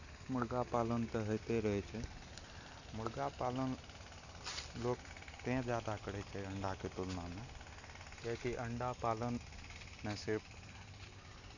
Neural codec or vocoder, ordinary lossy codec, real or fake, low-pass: none; none; real; 7.2 kHz